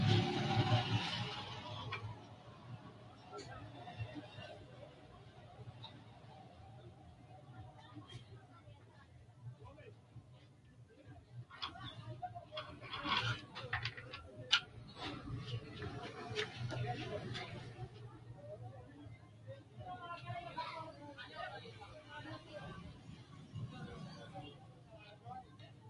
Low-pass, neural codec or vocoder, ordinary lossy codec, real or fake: 10.8 kHz; none; MP3, 48 kbps; real